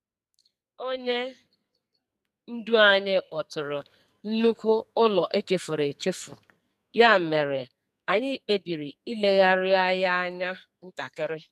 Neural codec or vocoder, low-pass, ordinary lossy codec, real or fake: codec, 44.1 kHz, 2.6 kbps, SNAC; 14.4 kHz; none; fake